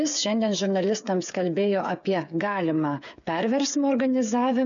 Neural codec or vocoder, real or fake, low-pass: codec, 16 kHz, 8 kbps, FreqCodec, smaller model; fake; 7.2 kHz